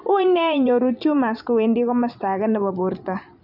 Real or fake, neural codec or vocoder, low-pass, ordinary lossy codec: fake; vocoder, 44.1 kHz, 128 mel bands every 512 samples, BigVGAN v2; 5.4 kHz; none